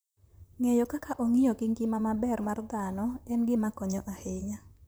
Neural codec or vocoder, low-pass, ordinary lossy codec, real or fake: vocoder, 44.1 kHz, 128 mel bands, Pupu-Vocoder; none; none; fake